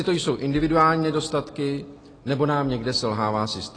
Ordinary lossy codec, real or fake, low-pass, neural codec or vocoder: AAC, 32 kbps; real; 9.9 kHz; none